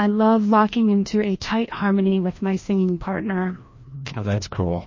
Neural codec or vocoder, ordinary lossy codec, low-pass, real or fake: codec, 16 kHz, 1 kbps, FreqCodec, larger model; MP3, 32 kbps; 7.2 kHz; fake